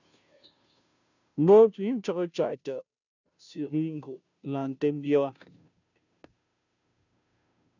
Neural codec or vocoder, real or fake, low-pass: codec, 16 kHz, 0.5 kbps, FunCodec, trained on Chinese and English, 25 frames a second; fake; 7.2 kHz